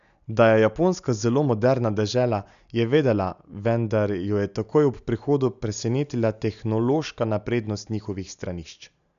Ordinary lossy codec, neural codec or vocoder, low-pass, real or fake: none; none; 7.2 kHz; real